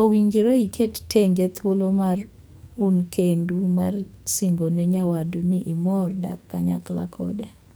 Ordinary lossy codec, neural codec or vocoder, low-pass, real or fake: none; codec, 44.1 kHz, 2.6 kbps, SNAC; none; fake